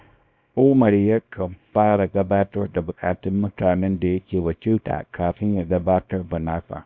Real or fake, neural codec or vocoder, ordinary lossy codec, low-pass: fake; codec, 24 kHz, 0.9 kbps, WavTokenizer, small release; Opus, 64 kbps; 7.2 kHz